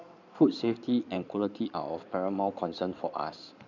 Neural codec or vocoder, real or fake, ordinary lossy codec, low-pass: codec, 16 kHz in and 24 kHz out, 2.2 kbps, FireRedTTS-2 codec; fake; none; 7.2 kHz